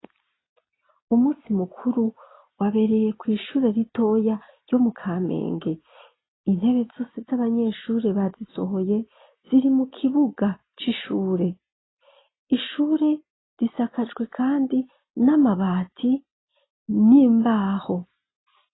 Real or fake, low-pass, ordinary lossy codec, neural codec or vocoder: real; 7.2 kHz; AAC, 16 kbps; none